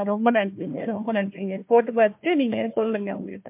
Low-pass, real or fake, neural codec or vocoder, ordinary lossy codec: 3.6 kHz; fake; codec, 16 kHz, 1 kbps, FunCodec, trained on Chinese and English, 50 frames a second; MP3, 32 kbps